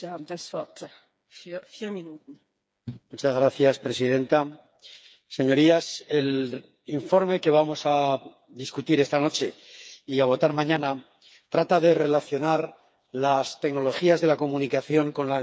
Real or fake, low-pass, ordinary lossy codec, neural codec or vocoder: fake; none; none; codec, 16 kHz, 4 kbps, FreqCodec, smaller model